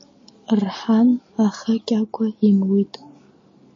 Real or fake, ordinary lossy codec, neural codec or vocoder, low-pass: real; MP3, 32 kbps; none; 7.2 kHz